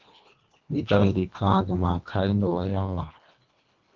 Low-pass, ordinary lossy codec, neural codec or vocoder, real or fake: 7.2 kHz; Opus, 24 kbps; codec, 24 kHz, 1.5 kbps, HILCodec; fake